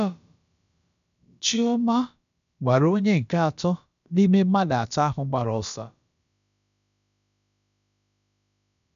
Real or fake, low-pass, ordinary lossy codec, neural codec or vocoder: fake; 7.2 kHz; none; codec, 16 kHz, about 1 kbps, DyCAST, with the encoder's durations